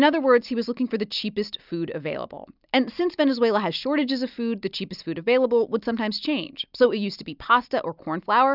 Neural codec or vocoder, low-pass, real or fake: none; 5.4 kHz; real